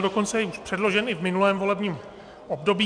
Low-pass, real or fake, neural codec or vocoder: 9.9 kHz; real; none